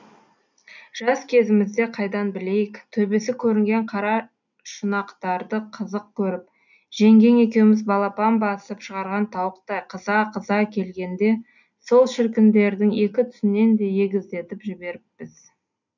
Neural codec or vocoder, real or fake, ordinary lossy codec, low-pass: none; real; none; 7.2 kHz